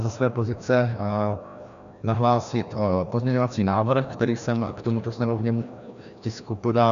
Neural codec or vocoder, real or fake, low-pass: codec, 16 kHz, 1 kbps, FreqCodec, larger model; fake; 7.2 kHz